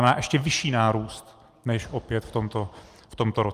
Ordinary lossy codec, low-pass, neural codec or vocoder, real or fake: Opus, 32 kbps; 14.4 kHz; none; real